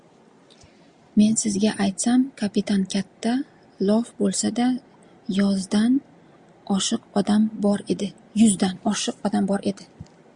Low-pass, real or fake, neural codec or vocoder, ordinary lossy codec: 9.9 kHz; real; none; Opus, 64 kbps